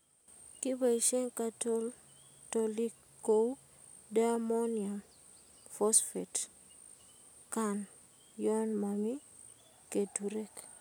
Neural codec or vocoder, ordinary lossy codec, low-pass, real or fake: none; none; none; real